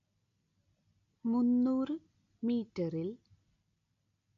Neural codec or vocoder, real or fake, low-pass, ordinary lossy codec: none; real; 7.2 kHz; MP3, 48 kbps